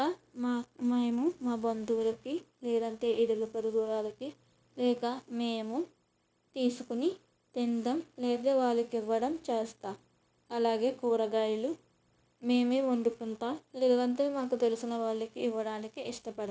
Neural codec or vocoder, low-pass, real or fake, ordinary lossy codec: codec, 16 kHz, 0.9 kbps, LongCat-Audio-Codec; none; fake; none